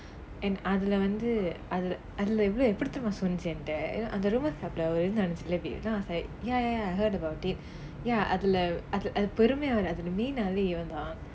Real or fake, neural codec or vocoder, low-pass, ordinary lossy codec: real; none; none; none